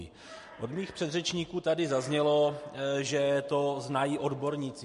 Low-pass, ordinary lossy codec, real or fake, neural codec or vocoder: 14.4 kHz; MP3, 48 kbps; real; none